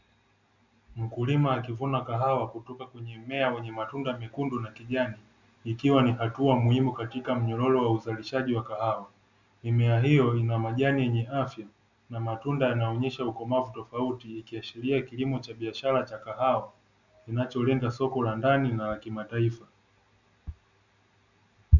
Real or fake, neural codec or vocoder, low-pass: real; none; 7.2 kHz